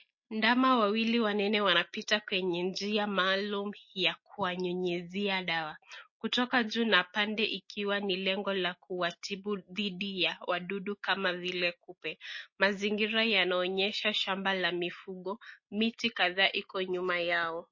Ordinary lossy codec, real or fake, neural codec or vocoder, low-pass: MP3, 32 kbps; real; none; 7.2 kHz